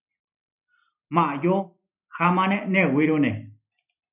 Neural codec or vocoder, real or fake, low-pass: none; real; 3.6 kHz